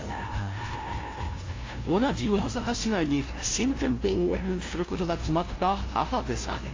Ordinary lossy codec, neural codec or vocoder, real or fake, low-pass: AAC, 32 kbps; codec, 16 kHz, 0.5 kbps, FunCodec, trained on LibriTTS, 25 frames a second; fake; 7.2 kHz